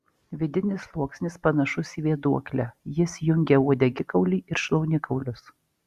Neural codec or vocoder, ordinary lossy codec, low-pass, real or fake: none; Opus, 64 kbps; 14.4 kHz; real